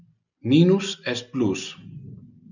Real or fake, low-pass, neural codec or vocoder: real; 7.2 kHz; none